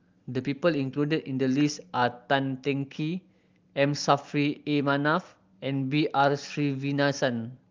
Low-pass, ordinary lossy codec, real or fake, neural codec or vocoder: 7.2 kHz; Opus, 32 kbps; real; none